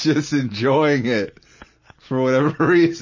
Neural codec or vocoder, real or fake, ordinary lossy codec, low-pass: none; real; MP3, 32 kbps; 7.2 kHz